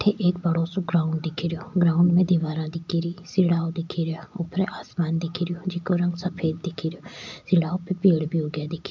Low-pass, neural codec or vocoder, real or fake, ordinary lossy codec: 7.2 kHz; none; real; MP3, 64 kbps